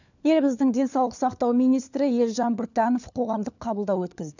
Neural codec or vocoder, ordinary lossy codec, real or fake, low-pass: codec, 16 kHz, 4 kbps, FunCodec, trained on LibriTTS, 50 frames a second; none; fake; 7.2 kHz